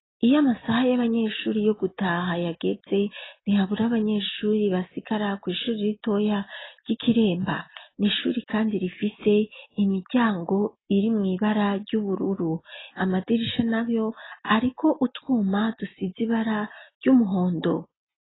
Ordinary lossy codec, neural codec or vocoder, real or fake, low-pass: AAC, 16 kbps; none; real; 7.2 kHz